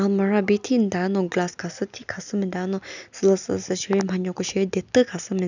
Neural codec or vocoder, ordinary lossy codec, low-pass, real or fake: none; none; 7.2 kHz; real